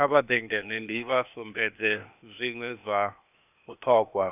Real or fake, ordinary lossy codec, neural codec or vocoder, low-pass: fake; none; codec, 16 kHz, 0.8 kbps, ZipCodec; 3.6 kHz